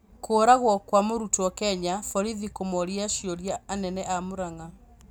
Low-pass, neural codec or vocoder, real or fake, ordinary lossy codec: none; none; real; none